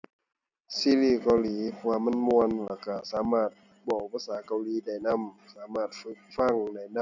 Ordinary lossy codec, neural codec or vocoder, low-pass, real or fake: none; none; 7.2 kHz; real